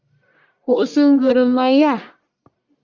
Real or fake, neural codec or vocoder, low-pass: fake; codec, 44.1 kHz, 1.7 kbps, Pupu-Codec; 7.2 kHz